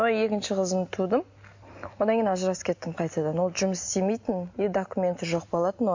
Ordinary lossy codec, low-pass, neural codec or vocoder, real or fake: MP3, 48 kbps; 7.2 kHz; none; real